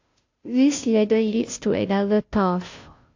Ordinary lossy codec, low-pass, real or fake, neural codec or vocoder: AAC, 48 kbps; 7.2 kHz; fake; codec, 16 kHz, 0.5 kbps, FunCodec, trained on Chinese and English, 25 frames a second